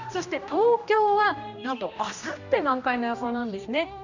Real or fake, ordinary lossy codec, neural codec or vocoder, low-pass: fake; none; codec, 16 kHz, 1 kbps, X-Codec, HuBERT features, trained on general audio; 7.2 kHz